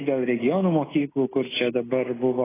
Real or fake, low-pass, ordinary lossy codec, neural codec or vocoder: real; 3.6 kHz; AAC, 16 kbps; none